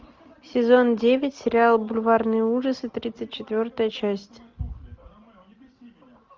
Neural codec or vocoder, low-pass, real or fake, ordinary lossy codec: none; 7.2 kHz; real; Opus, 24 kbps